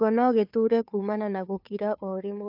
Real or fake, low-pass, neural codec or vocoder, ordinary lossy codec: fake; 5.4 kHz; codec, 16 kHz, 2 kbps, FunCodec, trained on Chinese and English, 25 frames a second; AAC, 48 kbps